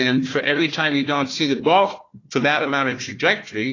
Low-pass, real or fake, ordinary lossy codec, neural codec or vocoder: 7.2 kHz; fake; AAC, 32 kbps; codec, 16 kHz, 1 kbps, FunCodec, trained on Chinese and English, 50 frames a second